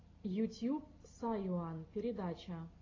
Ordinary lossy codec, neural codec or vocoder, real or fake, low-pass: AAC, 32 kbps; none; real; 7.2 kHz